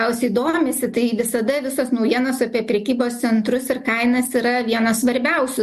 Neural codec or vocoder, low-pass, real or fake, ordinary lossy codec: none; 14.4 kHz; real; MP3, 64 kbps